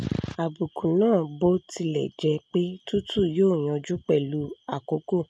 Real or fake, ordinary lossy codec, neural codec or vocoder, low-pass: real; none; none; none